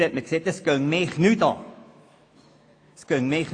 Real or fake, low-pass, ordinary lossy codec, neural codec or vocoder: fake; 9.9 kHz; AAC, 48 kbps; autoencoder, 48 kHz, 128 numbers a frame, DAC-VAE, trained on Japanese speech